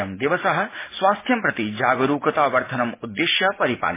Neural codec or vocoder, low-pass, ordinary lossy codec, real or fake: none; 3.6 kHz; MP3, 16 kbps; real